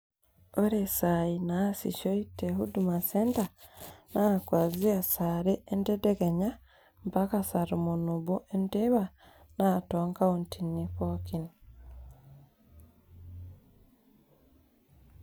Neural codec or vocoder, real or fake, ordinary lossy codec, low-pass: none; real; none; none